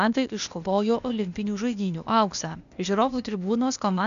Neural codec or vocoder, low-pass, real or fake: codec, 16 kHz, 0.8 kbps, ZipCodec; 7.2 kHz; fake